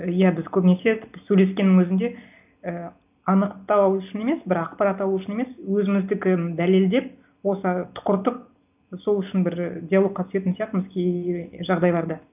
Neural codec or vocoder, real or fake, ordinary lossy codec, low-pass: none; real; none; 3.6 kHz